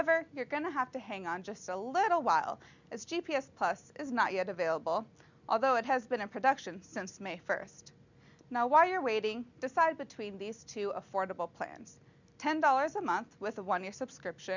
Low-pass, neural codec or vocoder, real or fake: 7.2 kHz; none; real